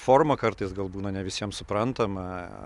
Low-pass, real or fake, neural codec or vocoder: 10.8 kHz; real; none